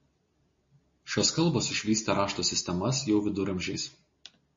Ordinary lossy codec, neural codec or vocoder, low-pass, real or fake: MP3, 32 kbps; none; 7.2 kHz; real